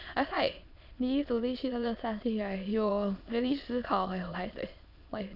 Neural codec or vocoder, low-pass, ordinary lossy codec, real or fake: autoencoder, 22.05 kHz, a latent of 192 numbers a frame, VITS, trained on many speakers; 5.4 kHz; none; fake